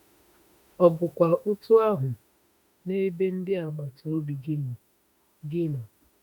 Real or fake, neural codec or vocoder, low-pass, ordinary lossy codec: fake; autoencoder, 48 kHz, 32 numbers a frame, DAC-VAE, trained on Japanese speech; 19.8 kHz; none